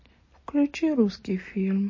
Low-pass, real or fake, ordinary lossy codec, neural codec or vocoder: 7.2 kHz; real; MP3, 32 kbps; none